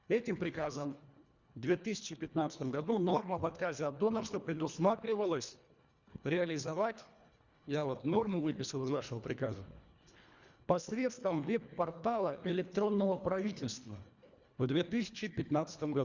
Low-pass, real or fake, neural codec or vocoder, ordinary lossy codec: 7.2 kHz; fake; codec, 24 kHz, 1.5 kbps, HILCodec; Opus, 64 kbps